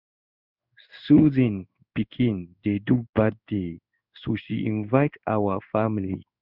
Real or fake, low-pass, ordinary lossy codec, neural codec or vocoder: fake; 5.4 kHz; none; codec, 16 kHz in and 24 kHz out, 1 kbps, XY-Tokenizer